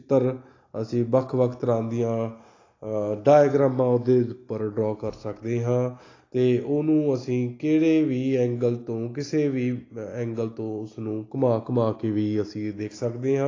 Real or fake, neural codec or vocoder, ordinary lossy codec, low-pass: real; none; AAC, 32 kbps; 7.2 kHz